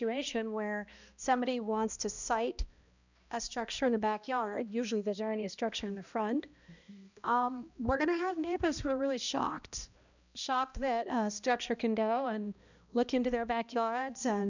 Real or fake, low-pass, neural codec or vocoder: fake; 7.2 kHz; codec, 16 kHz, 1 kbps, X-Codec, HuBERT features, trained on balanced general audio